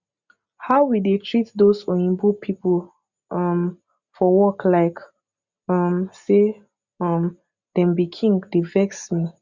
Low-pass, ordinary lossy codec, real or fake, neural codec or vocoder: 7.2 kHz; Opus, 64 kbps; real; none